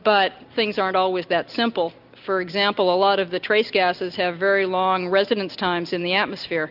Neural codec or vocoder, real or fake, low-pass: none; real; 5.4 kHz